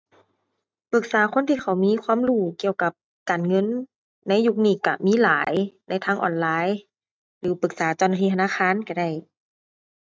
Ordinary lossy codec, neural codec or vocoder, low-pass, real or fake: none; none; none; real